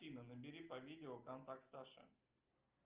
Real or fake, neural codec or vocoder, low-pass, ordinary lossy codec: real; none; 3.6 kHz; Opus, 24 kbps